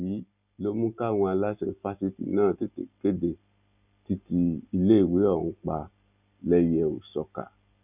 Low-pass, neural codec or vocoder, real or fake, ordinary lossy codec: 3.6 kHz; none; real; none